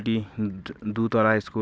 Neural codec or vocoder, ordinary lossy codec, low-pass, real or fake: none; none; none; real